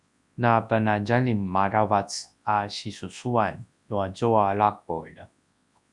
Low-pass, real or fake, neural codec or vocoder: 10.8 kHz; fake; codec, 24 kHz, 0.9 kbps, WavTokenizer, large speech release